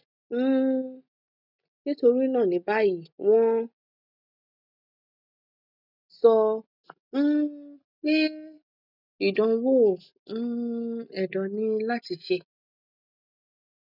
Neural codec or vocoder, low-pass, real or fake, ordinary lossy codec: none; 5.4 kHz; real; none